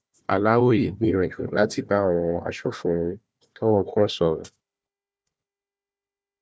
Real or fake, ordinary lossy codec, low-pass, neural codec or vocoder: fake; none; none; codec, 16 kHz, 1 kbps, FunCodec, trained on Chinese and English, 50 frames a second